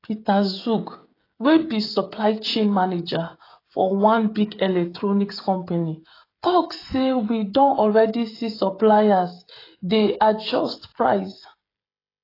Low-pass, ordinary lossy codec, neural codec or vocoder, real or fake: 5.4 kHz; AAC, 24 kbps; codec, 16 kHz, 16 kbps, FreqCodec, smaller model; fake